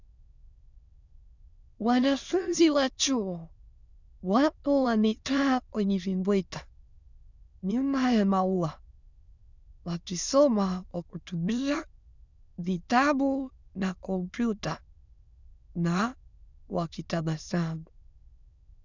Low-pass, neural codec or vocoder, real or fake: 7.2 kHz; autoencoder, 22.05 kHz, a latent of 192 numbers a frame, VITS, trained on many speakers; fake